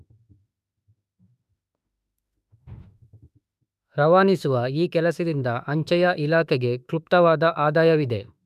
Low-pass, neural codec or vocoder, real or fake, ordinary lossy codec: 14.4 kHz; autoencoder, 48 kHz, 32 numbers a frame, DAC-VAE, trained on Japanese speech; fake; none